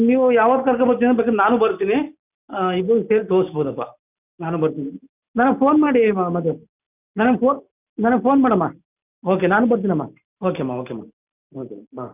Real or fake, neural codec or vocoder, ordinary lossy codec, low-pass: real; none; none; 3.6 kHz